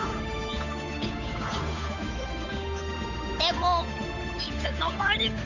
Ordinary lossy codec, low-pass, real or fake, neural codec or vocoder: none; 7.2 kHz; fake; codec, 16 kHz, 8 kbps, FunCodec, trained on Chinese and English, 25 frames a second